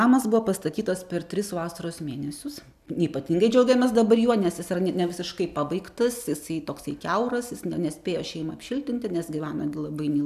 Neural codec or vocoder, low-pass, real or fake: none; 14.4 kHz; real